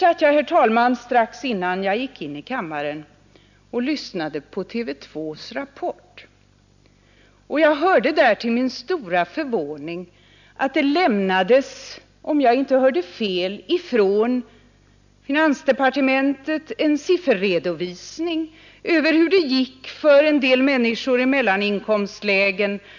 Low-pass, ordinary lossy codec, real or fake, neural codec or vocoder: 7.2 kHz; none; real; none